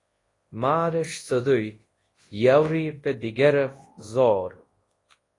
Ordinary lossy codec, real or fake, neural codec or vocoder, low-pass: AAC, 32 kbps; fake; codec, 24 kHz, 0.9 kbps, WavTokenizer, large speech release; 10.8 kHz